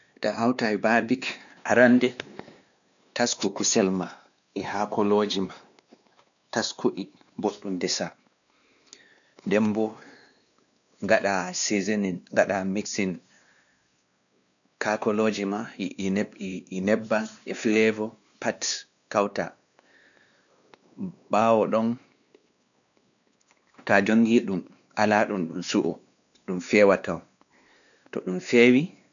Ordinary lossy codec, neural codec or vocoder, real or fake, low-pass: none; codec, 16 kHz, 2 kbps, X-Codec, WavLM features, trained on Multilingual LibriSpeech; fake; 7.2 kHz